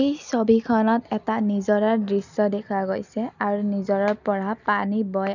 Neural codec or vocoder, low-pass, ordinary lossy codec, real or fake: none; 7.2 kHz; none; real